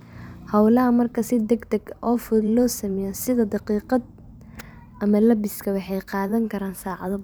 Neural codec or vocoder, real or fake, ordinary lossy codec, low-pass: vocoder, 44.1 kHz, 128 mel bands every 512 samples, BigVGAN v2; fake; none; none